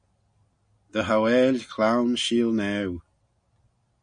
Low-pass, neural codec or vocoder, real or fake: 9.9 kHz; none; real